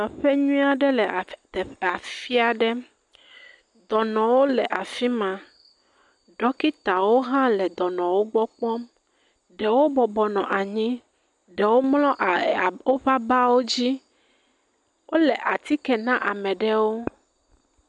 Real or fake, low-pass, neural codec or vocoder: real; 10.8 kHz; none